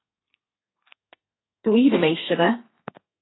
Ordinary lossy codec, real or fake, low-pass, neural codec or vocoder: AAC, 16 kbps; fake; 7.2 kHz; codec, 32 kHz, 1.9 kbps, SNAC